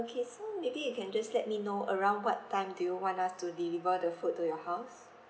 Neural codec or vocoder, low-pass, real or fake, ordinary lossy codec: none; none; real; none